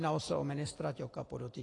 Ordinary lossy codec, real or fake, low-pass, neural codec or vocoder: AAC, 32 kbps; real; 10.8 kHz; none